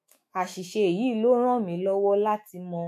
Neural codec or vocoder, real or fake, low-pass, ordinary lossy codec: autoencoder, 48 kHz, 128 numbers a frame, DAC-VAE, trained on Japanese speech; fake; 14.4 kHz; MP3, 64 kbps